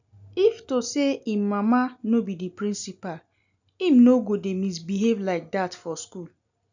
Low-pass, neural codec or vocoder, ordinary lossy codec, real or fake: 7.2 kHz; none; none; real